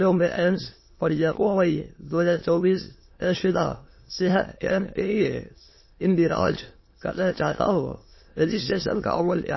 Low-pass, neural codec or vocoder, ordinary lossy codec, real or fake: 7.2 kHz; autoencoder, 22.05 kHz, a latent of 192 numbers a frame, VITS, trained on many speakers; MP3, 24 kbps; fake